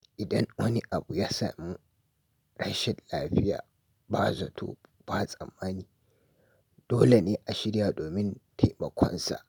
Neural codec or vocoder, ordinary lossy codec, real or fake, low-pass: none; none; real; none